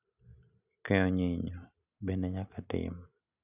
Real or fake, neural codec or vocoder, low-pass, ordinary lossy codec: real; none; 3.6 kHz; none